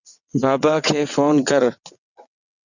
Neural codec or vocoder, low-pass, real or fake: vocoder, 22.05 kHz, 80 mel bands, WaveNeXt; 7.2 kHz; fake